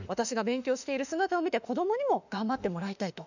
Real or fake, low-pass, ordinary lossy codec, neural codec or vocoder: fake; 7.2 kHz; none; autoencoder, 48 kHz, 32 numbers a frame, DAC-VAE, trained on Japanese speech